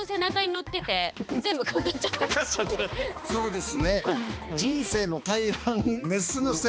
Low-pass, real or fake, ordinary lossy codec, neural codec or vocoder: none; fake; none; codec, 16 kHz, 2 kbps, X-Codec, HuBERT features, trained on balanced general audio